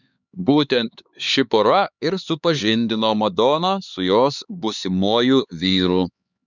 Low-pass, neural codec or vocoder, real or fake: 7.2 kHz; codec, 16 kHz, 4 kbps, X-Codec, HuBERT features, trained on LibriSpeech; fake